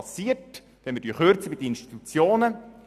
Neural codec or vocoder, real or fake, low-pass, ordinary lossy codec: none; real; 14.4 kHz; none